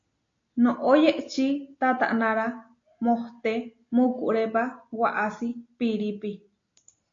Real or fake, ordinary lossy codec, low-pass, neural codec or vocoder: real; AAC, 48 kbps; 7.2 kHz; none